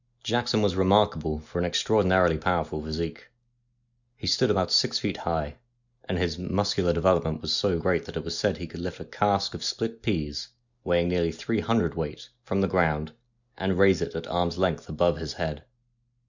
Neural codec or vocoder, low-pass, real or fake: none; 7.2 kHz; real